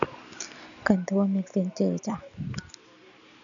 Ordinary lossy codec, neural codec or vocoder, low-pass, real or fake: none; none; 7.2 kHz; real